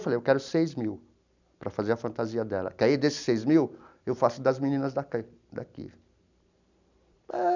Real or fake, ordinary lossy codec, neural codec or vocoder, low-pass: real; none; none; 7.2 kHz